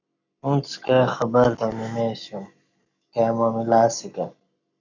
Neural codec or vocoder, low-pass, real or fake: codec, 44.1 kHz, 7.8 kbps, Pupu-Codec; 7.2 kHz; fake